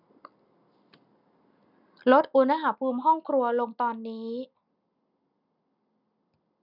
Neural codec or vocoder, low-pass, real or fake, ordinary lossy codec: none; 5.4 kHz; real; none